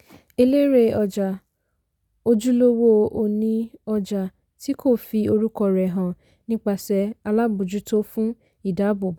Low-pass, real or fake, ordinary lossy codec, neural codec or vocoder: 19.8 kHz; real; none; none